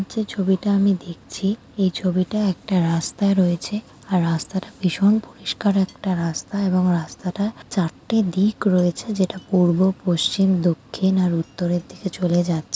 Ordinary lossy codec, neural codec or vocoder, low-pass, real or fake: none; none; none; real